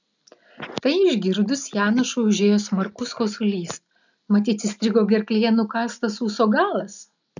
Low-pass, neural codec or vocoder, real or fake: 7.2 kHz; none; real